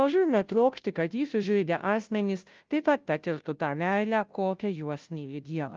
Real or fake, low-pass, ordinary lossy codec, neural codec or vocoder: fake; 7.2 kHz; Opus, 32 kbps; codec, 16 kHz, 0.5 kbps, FunCodec, trained on Chinese and English, 25 frames a second